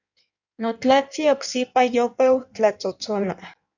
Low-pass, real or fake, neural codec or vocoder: 7.2 kHz; fake; codec, 16 kHz in and 24 kHz out, 1.1 kbps, FireRedTTS-2 codec